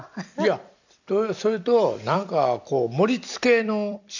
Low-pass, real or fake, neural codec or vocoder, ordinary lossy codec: 7.2 kHz; fake; vocoder, 44.1 kHz, 128 mel bands every 256 samples, BigVGAN v2; none